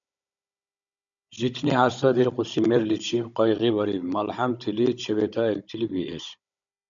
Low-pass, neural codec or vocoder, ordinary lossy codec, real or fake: 7.2 kHz; codec, 16 kHz, 16 kbps, FunCodec, trained on Chinese and English, 50 frames a second; MP3, 96 kbps; fake